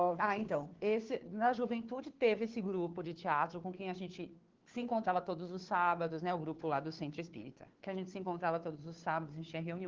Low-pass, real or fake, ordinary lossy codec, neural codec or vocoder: 7.2 kHz; fake; Opus, 16 kbps; codec, 16 kHz, 2 kbps, FunCodec, trained on Chinese and English, 25 frames a second